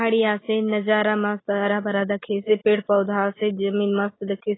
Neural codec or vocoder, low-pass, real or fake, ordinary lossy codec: none; 7.2 kHz; real; AAC, 16 kbps